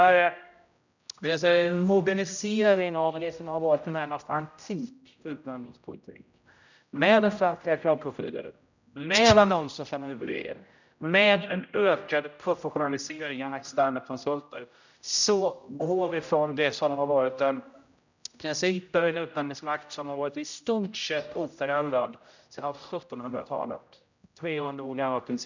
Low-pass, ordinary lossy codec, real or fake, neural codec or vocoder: 7.2 kHz; none; fake; codec, 16 kHz, 0.5 kbps, X-Codec, HuBERT features, trained on general audio